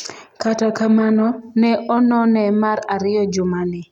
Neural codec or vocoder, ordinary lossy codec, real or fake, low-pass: none; none; real; 19.8 kHz